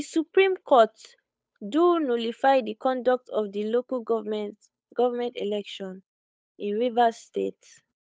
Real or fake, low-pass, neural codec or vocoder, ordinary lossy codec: fake; none; codec, 16 kHz, 8 kbps, FunCodec, trained on Chinese and English, 25 frames a second; none